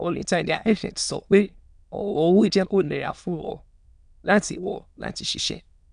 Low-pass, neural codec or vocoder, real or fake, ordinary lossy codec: 9.9 kHz; autoencoder, 22.05 kHz, a latent of 192 numbers a frame, VITS, trained on many speakers; fake; none